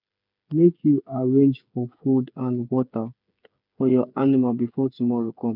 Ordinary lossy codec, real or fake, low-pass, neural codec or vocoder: none; fake; 5.4 kHz; codec, 16 kHz, 8 kbps, FreqCodec, smaller model